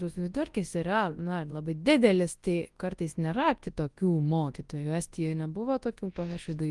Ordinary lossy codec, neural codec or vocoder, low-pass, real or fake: Opus, 24 kbps; codec, 24 kHz, 0.9 kbps, WavTokenizer, large speech release; 10.8 kHz; fake